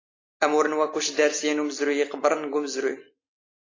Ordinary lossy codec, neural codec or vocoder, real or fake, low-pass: AAC, 32 kbps; none; real; 7.2 kHz